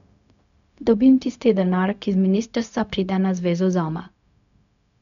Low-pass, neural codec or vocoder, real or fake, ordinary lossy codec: 7.2 kHz; codec, 16 kHz, 0.4 kbps, LongCat-Audio-Codec; fake; none